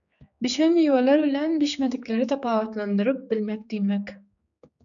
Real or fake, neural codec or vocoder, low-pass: fake; codec, 16 kHz, 4 kbps, X-Codec, HuBERT features, trained on general audio; 7.2 kHz